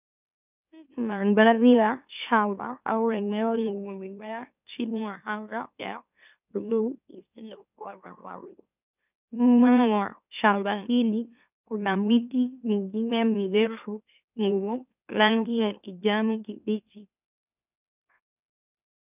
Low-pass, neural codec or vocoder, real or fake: 3.6 kHz; autoencoder, 44.1 kHz, a latent of 192 numbers a frame, MeloTTS; fake